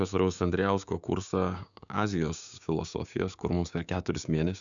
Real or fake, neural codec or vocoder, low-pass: fake; codec, 16 kHz, 6 kbps, DAC; 7.2 kHz